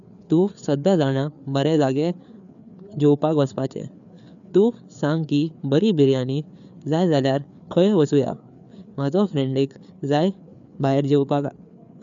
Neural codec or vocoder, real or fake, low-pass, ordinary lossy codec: codec, 16 kHz, 4 kbps, FreqCodec, larger model; fake; 7.2 kHz; none